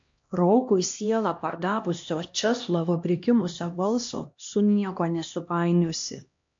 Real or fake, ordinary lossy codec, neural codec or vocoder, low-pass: fake; MP3, 48 kbps; codec, 16 kHz, 1 kbps, X-Codec, HuBERT features, trained on LibriSpeech; 7.2 kHz